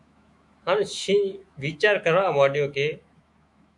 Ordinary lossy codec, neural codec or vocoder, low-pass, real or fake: MP3, 96 kbps; autoencoder, 48 kHz, 128 numbers a frame, DAC-VAE, trained on Japanese speech; 10.8 kHz; fake